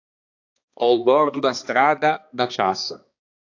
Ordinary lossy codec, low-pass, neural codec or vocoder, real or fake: AAC, 48 kbps; 7.2 kHz; codec, 16 kHz, 2 kbps, X-Codec, HuBERT features, trained on balanced general audio; fake